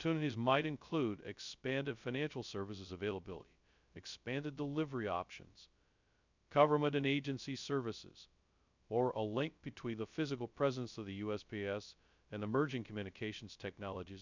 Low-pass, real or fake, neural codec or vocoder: 7.2 kHz; fake; codec, 16 kHz, 0.2 kbps, FocalCodec